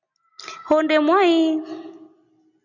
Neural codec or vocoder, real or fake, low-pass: none; real; 7.2 kHz